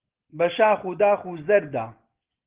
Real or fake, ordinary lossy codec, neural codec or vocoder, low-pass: real; Opus, 16 kbps; none; 3.6 kHz